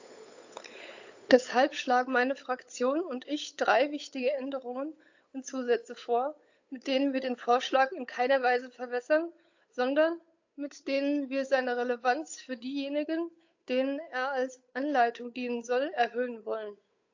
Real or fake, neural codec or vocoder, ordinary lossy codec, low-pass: fake; codec, 16 kHz, 8 kbps, FunCodec, trained on Chinese and English, 25 frames a second; AAC, 48 kbps; 7.2 kHz